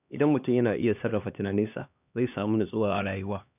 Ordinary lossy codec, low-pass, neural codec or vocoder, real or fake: none; 3.6 kHz; codec, 16 kHz, 2 kbps, X-Codec, HuBERT features, trained on LibriSpeech; fake